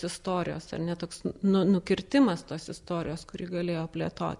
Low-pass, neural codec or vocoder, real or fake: 10.8 kHz; none; real